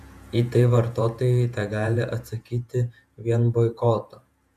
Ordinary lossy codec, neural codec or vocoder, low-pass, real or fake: AAC, 96 kbps; vocoder, 48 kHz, 128 mel bands, Vocos; 14.4 kHz; fake